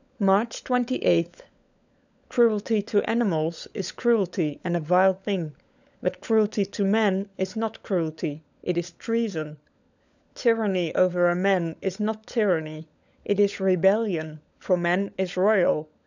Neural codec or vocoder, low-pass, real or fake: codec, 16 kHz, 16 kbps, FunCodec, trained on LibriTTS, 50 frames a second; 7.2 kHz; fake